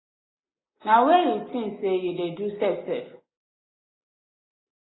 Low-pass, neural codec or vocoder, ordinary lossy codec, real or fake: 7.2 kHz; none; AAC, 16 kbps; real